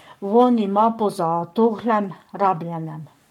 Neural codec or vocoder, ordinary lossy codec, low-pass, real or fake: codec, 44.1 kHz, 7.8 kbps, Pupu-Codec; MP3, 96 kbps; 19.8 kHz; fake